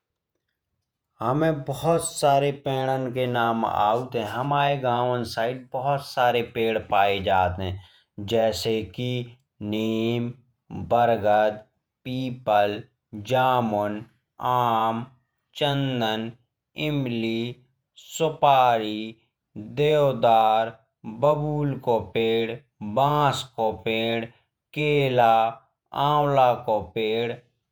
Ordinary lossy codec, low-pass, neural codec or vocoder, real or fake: none; none; vocoder, 48 kHz, 128 mel bands, Vocos; fake